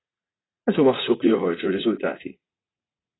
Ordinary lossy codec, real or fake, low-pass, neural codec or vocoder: AAC, 16 kbps; fake; 7.2 kHz; vocoder, 44.1 kHz, 80 mel bands, Vocos